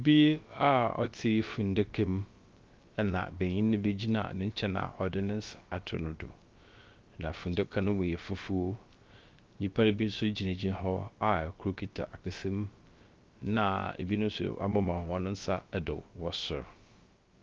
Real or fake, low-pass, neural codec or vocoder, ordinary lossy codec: fake; 7.2 kHz; codec, 16 kHz, about 1 kbps, DyCAST, with the encoder's durations; Opus, 32 kbps